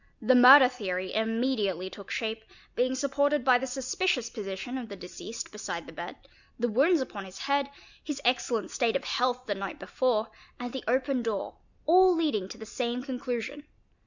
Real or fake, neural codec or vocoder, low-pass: real; none; 7.2 kHz